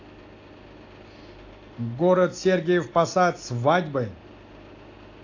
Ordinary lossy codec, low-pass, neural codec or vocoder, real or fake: AAC, 48 kbps; 7.2 kHz; none; real